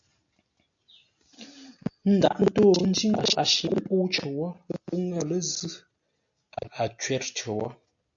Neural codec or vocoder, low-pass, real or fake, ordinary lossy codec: none; 7.2 kHz; real; AAC, 64 kbps